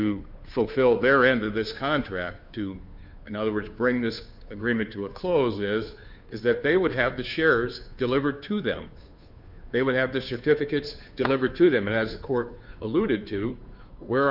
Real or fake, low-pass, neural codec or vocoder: fake; 5.4 kHz; codec, 16 kHz, 2 kbps, FunCodec, trained on Chinese and English, 25 frames a second